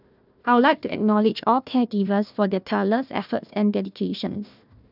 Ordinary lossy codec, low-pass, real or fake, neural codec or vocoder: none; 5.4 kHz; fake; codec, 16 kHz, 1 kbps, FunCodec, trained on Chinese and English, 50 frames a second